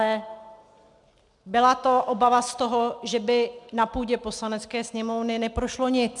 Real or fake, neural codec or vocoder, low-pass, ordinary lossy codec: real; none; 10.8 kHz; MP3, 96 kbps